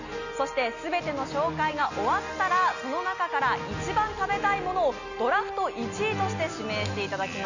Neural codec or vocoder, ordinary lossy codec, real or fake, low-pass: none; none; real; 7.2 kHz